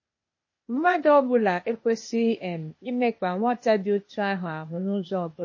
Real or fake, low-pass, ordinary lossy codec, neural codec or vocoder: fake; 7.2 kHz; MP3, 32 kbps; codec, 16 kHz, 0.8 kbps, ZipCodec